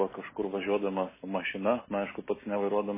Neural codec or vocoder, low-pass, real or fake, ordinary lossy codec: none; 3.6 kHz; real; MP3, 16 kbps